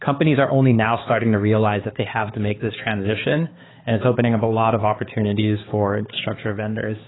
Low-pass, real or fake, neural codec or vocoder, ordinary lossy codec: 7.2 kHz; fake; codec, 16 kHz, 4 kbps, X-Codec, HuBERT features, trained on balanced general audio; AAC, 16 kbps